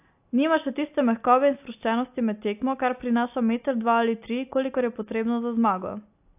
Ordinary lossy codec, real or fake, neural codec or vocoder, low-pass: AAC, 32 kbps; real; none; 3.6 kHz